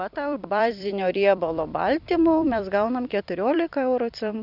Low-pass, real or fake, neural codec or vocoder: 5.4 kHz; fake; vocoder, 44.1 kHz, 128 mel bands every 256 samples, BigVGAN v2